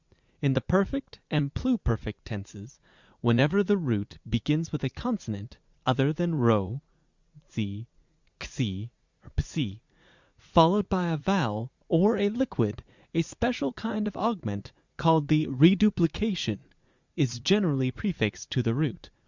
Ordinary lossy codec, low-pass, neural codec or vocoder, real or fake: Opus, 64 kbps; 7.2 kHz; vocoder, 44.1 kHz, 80 mel bands, Vocos; fake